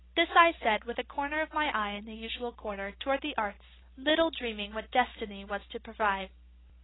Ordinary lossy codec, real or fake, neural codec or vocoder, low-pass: AAC, 16 kbps; real; none; 7.2 kHz